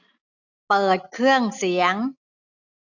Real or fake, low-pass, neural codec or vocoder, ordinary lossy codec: real; 7.2 kHz; none; none